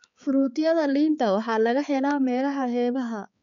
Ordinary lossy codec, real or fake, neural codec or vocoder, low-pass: none; fake; codec, 16 kHz, 4 kbps, X-Codec, HuBERT features, trained on balanced general audio; 7.2 kHz